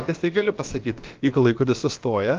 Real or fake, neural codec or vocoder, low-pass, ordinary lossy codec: fake; codec, 16 kHz, about 1 kbps, DyCAST, with the encoder's durations; 7.2 kHz; Opus, 32 kbps